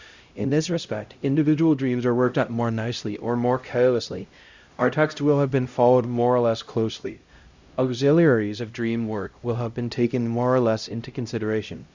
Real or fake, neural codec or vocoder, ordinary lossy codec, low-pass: fake; codec, 16 kHz, 0.5 kbps, X-Codec, HuBERT features, trained on LibriSpeech; Opus, 64 kbps; 7.2 kHz